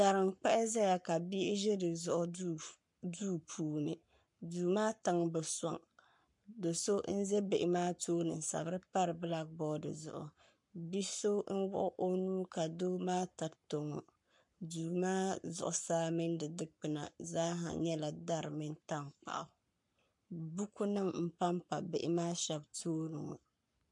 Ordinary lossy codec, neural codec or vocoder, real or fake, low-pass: MP3, 64 kbps; codec, 44.1 kHz, 7.8 kbps, Pupu-Codec; fake; 10.8 kHz